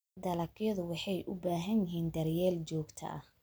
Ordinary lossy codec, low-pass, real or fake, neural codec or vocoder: none; none; real; none